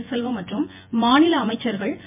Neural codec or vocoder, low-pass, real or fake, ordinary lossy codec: vocoder, 24 kHz, 100 mel bands, Vocos; 3.6 kHz; fake; MP3, 32 kbps